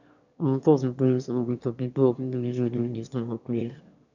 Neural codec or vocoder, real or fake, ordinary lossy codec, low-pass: autoencoder, 22.05 kHz, a latent of 192 numbers a frame, VITS, trained on one speaker; fake; none; 7.2 kHz